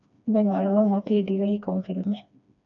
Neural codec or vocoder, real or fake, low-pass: codec, 16 kHz, 2 kbps, FreqCodec, smaller model; fake; 7.2 kHz